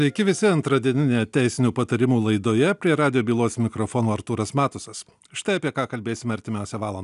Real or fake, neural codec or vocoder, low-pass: real; none; 10.8 kHz